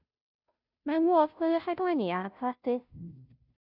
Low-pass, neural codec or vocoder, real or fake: 5.4 kHz; codec, 16 kHz, 0.5 kbps, FunCodec, trained on Chinese and English, 25 frames a second; fake